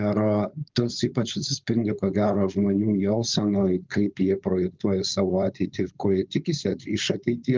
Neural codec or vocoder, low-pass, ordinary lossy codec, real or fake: codec, 16 kHz, 4.8 kbps, FACodec; 7.2 kHz; Opus, 32 kbps; fake